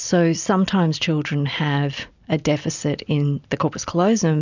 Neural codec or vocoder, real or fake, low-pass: none; real; 7.2 kHz